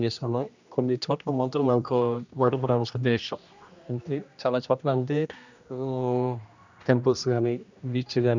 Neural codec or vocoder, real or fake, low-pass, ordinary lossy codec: codec, 16 kHz, 1 kbps, X-Codec, HuBERT features, trained on general audio; fake; 7.2 kHz; none